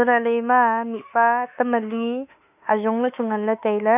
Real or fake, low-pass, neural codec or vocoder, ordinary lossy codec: fake; 3.6 kHz; autoencoder, 48 kHz, 32 numbers a frame, DAC-VAE, trained on Japanese speech; none